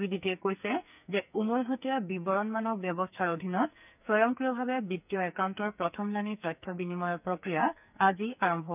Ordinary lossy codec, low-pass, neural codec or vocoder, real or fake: none; 3.6 kHz; codec, 44.1 kHz, 2.6 kbps, SNAC; fake